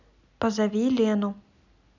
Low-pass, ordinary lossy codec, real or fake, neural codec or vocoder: 7.2 kHz; none; real; none